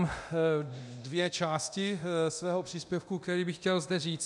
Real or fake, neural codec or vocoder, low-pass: fake; codec, 24 kHz, 0.9 kbps, DualCodec; 10.8 kHz